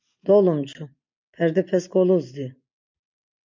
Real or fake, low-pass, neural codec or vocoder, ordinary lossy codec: real; 7.2 kHz; none; MP3, 64 kbps